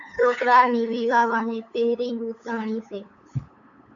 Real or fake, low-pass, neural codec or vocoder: fake; 7.2 kHz; codec, 16 kHz, 8 kbps, FunCodec, trained on LibriTTS, 25 frames a second